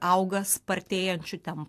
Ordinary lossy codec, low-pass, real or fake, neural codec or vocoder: AAC, 64 kbps; 14.4 kHz; real; none